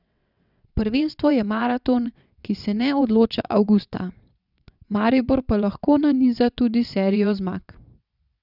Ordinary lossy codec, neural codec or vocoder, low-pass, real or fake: none; vocoder, 22.05 kHz, 80 mel bands, WaveNeXt; 5.4 kHz; fake